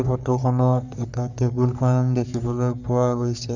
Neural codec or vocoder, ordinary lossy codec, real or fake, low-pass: codec, 44.1 kHz, 3.4 kbps, Pupu-Codec; none; fake; 7.2 kHz